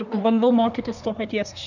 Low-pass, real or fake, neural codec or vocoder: 7.2 kHz; fake; codec, 24 kHz, 1 kbps, SNAC